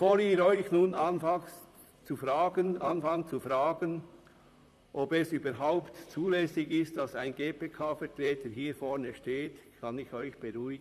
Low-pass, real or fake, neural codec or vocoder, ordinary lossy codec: 14.4 kHz; fake; vocoder, 44.1 kHz, 128 mel bands, Pupu-Vocoder; none